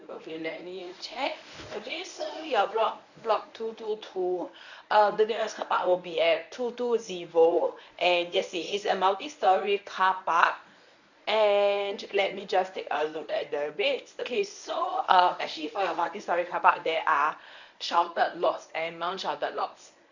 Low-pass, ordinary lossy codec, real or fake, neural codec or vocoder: 7.2 kHz; none; fake; codec, 24 kHz, 0.9 kbps, WavTokenizer, medium speech release version 1